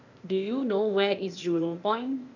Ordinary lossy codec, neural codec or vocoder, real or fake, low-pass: none; codec, 16 kHz, 0.8 kbps, ZipCodec; fake; 7.2 kHz